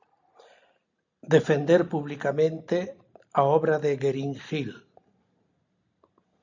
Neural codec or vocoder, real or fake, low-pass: none; real; 7.2 kHz